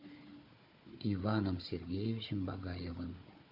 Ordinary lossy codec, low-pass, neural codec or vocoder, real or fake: AAC, 32 kbps; 5.4 kHz; codec, 16 kHz, 4 kbps, FunCodec, trained on Chinese and English, 50 frames a second; fake